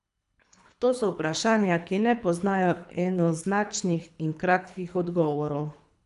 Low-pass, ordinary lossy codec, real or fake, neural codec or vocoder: 10.8 kHz; AAC, 96 kbps; fake; codec, 24 kHz, 3 kbps, HILCodec